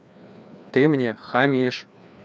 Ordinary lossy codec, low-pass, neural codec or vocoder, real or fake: none; none; codec, 16 kHz, 2 kbps, FreqCodec, larger model; fake